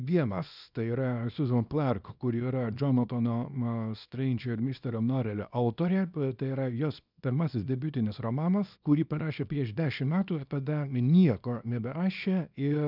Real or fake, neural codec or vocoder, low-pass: fake; codec, 24 kHz, 0.9 kbps, WavTokenizer, small release; 5.4 kHz